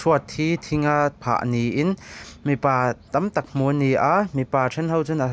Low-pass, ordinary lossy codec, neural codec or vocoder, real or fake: none; none; none; real